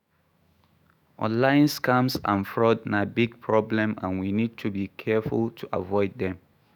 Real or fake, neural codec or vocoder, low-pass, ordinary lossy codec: fake; autoencoder, 48 kHz, 128 numbers a frame, DAC-VAE, trained on Japanese speech; 19.8 kHz; none